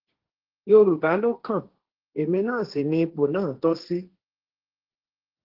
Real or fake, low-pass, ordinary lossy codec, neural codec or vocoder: fake; 5.4 kHz; Opus, 16 kbps; codec, 16 kHz, 1.1 kbps, Voila-Tokenizer